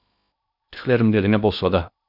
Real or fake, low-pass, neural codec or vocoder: fake; 5.4 kHz; codec, 16 kHz in and 24 kHz out, 0.6 kbps, FocalCodec, streaming, 2048 codes